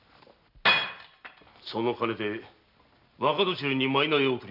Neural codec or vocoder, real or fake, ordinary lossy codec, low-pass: none; real; none; 5.4 kHz